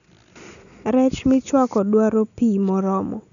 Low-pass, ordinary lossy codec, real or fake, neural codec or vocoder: 7.2 kHz; none; real; none